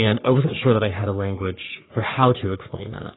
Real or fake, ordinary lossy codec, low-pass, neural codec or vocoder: fake; AAC, 16 kbps; 7.2 kHz; codec, 44.1 kHz, 3.4 kbps, Pupu-Codec